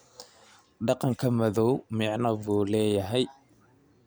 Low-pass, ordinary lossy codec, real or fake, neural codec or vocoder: none; none; real; none